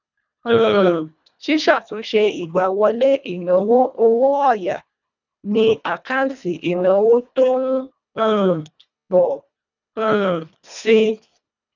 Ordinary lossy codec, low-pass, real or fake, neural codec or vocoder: none; 7.2 kHz; fake; codec, 24 kHz, 1.5 kbps, HILCodec